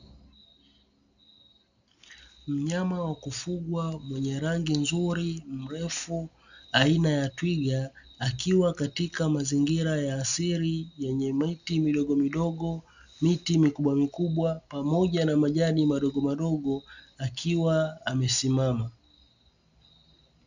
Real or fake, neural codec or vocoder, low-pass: real; none; 7.2 kHz